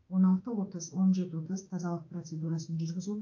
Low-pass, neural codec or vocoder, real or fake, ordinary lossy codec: 7.2 kHz; autoencoder, 48 kHz, 32 numbers a frame, DAC-VAE, trained on Japanese speech; fake; AAC, 48 kbps